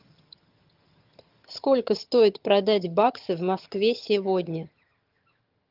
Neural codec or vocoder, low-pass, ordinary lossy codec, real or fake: vocoder, 22.05 kHz, 80 mel bands, HiFi-GAN; 5.4 kHz; Opus, 32 kbps; fake